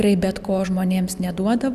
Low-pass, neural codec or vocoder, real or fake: 14.4 kHz; none; real